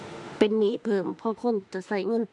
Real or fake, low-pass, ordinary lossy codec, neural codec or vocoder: fake; 10.8 kHz; none; autoencoder, 48 kHz, 32 numbers a frame, DAC-VAE, trained on Japanese speech